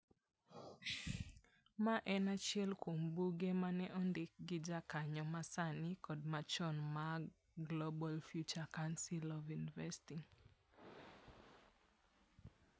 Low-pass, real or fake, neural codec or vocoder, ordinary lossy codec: none; real; none; none